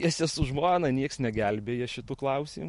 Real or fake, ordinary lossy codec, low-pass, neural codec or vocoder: real; MP3, 48 kbps; 14.4 kHz; none